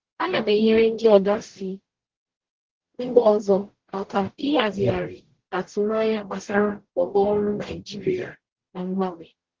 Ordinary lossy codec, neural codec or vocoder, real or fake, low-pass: Opus, 16 kbps; codec, 44.1 kHz, 0.9 kbps, DAC; fake; 7.2 kHz